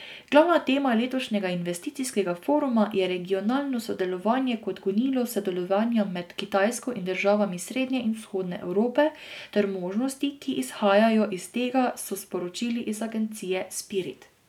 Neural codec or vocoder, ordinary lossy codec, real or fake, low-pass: none; none; real; 19.8 kHz